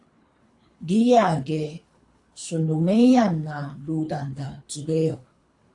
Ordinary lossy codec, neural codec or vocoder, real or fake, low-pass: AAC, 48 kbps; codec, 24 kHz, 3 kbps, HILCodec; fake; 10.8 kHz